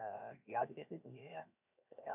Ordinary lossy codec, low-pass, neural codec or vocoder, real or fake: none; 3.6 kHz; codec, 16 kHz, 0.7 kbps, FocalCodec; fake